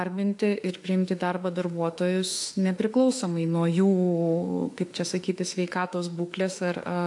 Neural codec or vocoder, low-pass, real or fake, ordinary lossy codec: autoencoder, 48 kHz, 32 numbers a frame, DAC-VAE, trained on Japanese speech; 10.8 kHz; fake; AAC, 64 kbps